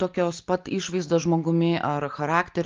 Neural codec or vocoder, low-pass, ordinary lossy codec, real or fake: none; 7.2 kHz; Opus, 32 kbps; real